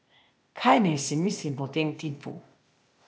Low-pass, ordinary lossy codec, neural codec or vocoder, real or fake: none; none; codec, 16 kHz, 0.8 kbps, ZipCodec; fake